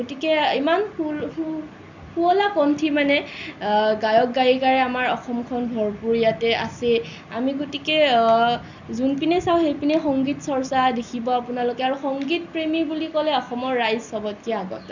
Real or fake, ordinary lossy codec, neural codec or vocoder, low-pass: real; none; none; 7.2 kHz